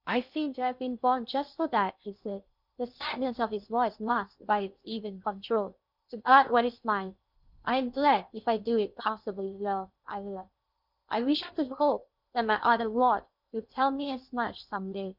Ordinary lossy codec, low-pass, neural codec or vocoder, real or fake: Opus, 64 kbps; 5.4 kHz; codec, 16 kHz in and 24 kHz out, 0.6 kbps, FocalCodec, streaming, 2048 codes; fake